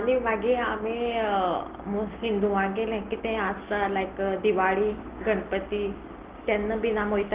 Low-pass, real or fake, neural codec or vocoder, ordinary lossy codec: 3.6 kHz; real; none; Opus, 16 kbps